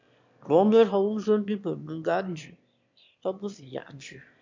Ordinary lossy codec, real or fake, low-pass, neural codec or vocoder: MP3, 64 kbps; fake; 7.2 kHz; autoencoder, 22.05 kHz, a latent of 192 numbers a frame, VITS, trained on one speaker